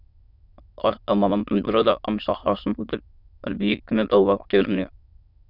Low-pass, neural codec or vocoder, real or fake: 5.4 kHz; autoencoder, 22.05 kHz, a latent of 192 numbers a frame, VITS, trained on many speakers; fake